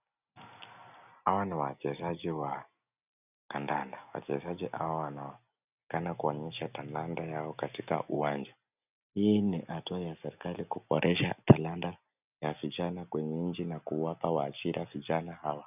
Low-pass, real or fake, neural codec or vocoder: 3.6 kHz; real; none